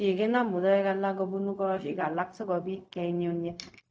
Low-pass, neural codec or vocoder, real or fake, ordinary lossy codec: none; codec, 16 kHz, 0.4 kbps, LongCat-Audio-Codec; fake; none